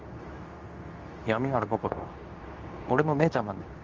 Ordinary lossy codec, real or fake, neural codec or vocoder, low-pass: Opus, 32 kbps; fake; codec, 24 kHz, 0.9 kbps, WavTokenizer, medium speech release version 2; 7.2 kHz